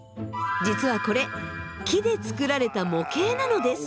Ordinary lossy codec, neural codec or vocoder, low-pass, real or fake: none; none; none; real